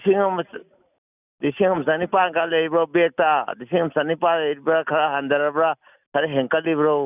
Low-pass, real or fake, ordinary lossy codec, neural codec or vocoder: 3.6 kHz; real; none; none